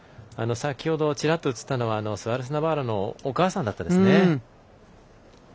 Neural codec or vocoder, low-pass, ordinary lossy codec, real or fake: none; none; none; real